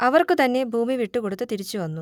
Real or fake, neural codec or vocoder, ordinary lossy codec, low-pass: real; none; none; 19.8 kHz